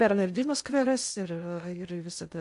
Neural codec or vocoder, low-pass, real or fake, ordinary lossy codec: codec, 16 kHz in and 24 kHz out, 0.6 kbps, FocalCodec, streaming, 2048 codes; 10.8 kHz; fake; MP3, 64 kbps